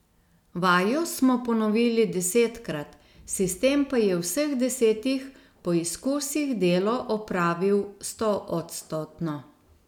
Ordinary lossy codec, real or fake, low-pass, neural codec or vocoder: none; real; 19.8 kHz; none